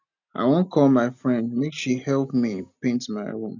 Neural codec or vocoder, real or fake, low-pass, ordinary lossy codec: none; real; 7.2 kHz; none